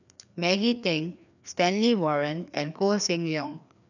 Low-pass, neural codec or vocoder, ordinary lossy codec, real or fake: 7.2 kHz; codec, 16 kHz, 2 kbps, FreqCodec, larger model; none; fake